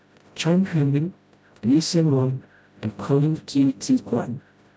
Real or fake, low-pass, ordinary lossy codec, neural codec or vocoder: fake; none; none; codec, 16 kHz, 0.5 kbps, FreqCodec, smaller model